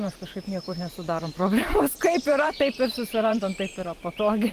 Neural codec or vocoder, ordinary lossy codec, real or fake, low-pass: vocoder, 44.1 kHz, 128 mel bands every 512 samples, BigVGAN v2; Opus, 24 kbps; fake; 14.4 kHz